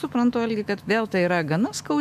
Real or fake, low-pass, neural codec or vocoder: fake; 14.4 kHz; autoencoder, 48 kHz, 128 numbers a frame, DAC-VAE, trained on Japanese speech